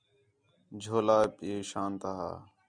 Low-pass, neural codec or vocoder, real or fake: 9.9 kHz; none; real